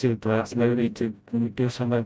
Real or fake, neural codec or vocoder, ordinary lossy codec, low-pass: fake; codec, 16 kHz, 0.5 kbps, FreqCodec, smaller model; none; none